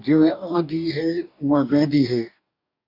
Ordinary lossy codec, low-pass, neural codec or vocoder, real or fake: MP3, 48 kbps; 5.4 kHz; codec, 44.1 kHz, 2.6 kbps, DAC; fake